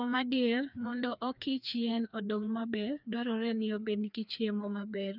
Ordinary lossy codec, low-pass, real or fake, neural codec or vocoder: none; 5.4 kHz; fake; codec, 16 kHz, 2 kbps, FreqCodec, larger model